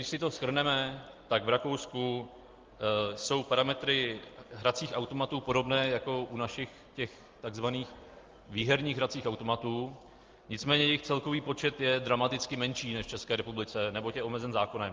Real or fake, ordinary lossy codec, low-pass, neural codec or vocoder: real; Opus, 32 kbps; 7.2 kHz; none